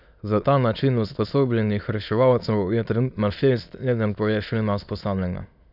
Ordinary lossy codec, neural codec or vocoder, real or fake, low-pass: none; autoencoder, 22.05 kHz, a latent of 192 numbers a frame, VITS, trained on many speakers; fake; 5.4 kHz